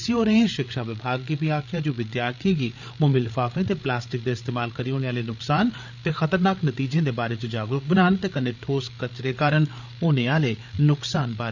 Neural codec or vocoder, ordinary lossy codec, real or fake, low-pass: codec, 16 kHz, 8 kbps, FreqCodec, larger model; none; fake; 7.2 kHz